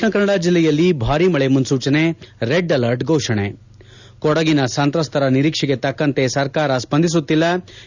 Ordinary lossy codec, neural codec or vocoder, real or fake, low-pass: none; none; real; 7.2 kHz